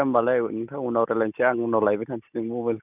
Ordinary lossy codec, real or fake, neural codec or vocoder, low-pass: none; real; none; 3.6 kHz